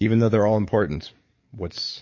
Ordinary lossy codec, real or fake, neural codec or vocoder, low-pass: MP3, 32 kbps; fake; codec, 44.1 kHz, 7.8 kbps, DAC; 7.2 kHz